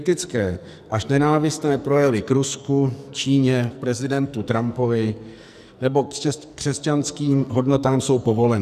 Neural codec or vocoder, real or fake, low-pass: codec, 44.1 kHz, 2.6 kbps, SNAC; fake; 14.4 kHz